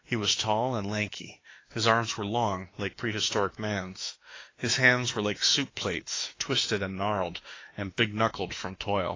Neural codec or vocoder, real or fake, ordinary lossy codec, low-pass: autoencoder, 48 kHz, 32 numbers a frame, DAC-VAE, trained on Japanese speech; fake; AAC, 32 kbps; 7.2 kHz